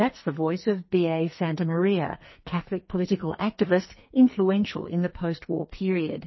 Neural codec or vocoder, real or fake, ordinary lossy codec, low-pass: codec, 44.1 kHz, 2.6 kbps, SNAC; fake; MP3, 24 kbps; 7.2 kHz